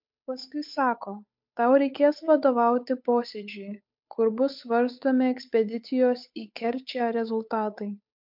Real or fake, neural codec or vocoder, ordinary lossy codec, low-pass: fake; codec, 16 kHz, 8 kbps, FunCodec, trained on Chinese and English, 25 frames a second; MP3, 48 kbps; 5.4 kHz